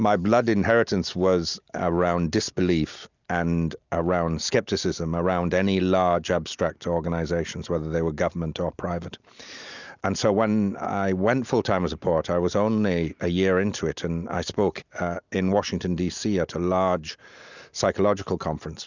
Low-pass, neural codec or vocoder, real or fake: 7.2 kHz; none; real